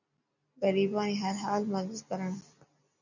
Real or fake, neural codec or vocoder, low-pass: real; none; 7.2 kHz